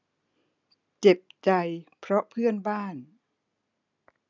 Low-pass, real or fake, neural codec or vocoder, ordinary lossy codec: 7.2 kHz; real; none; none